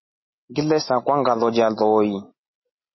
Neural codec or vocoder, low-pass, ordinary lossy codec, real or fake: none; 7.2 kHz; MP3, 24 kbps; real